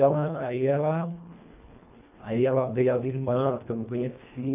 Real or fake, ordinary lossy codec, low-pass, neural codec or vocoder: fake; none; 3.6 kHz; codec, 24 kHz, 1.5 kbps, HILCodec